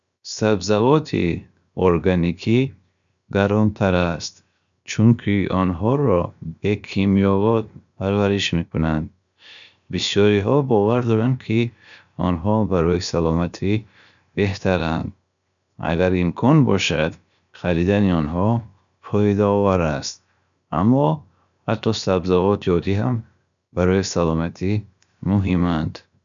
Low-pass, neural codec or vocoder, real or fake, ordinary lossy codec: 7.2 kHz; codec, 16 kHz, 0.7 kbps, FocalCodec; fake; none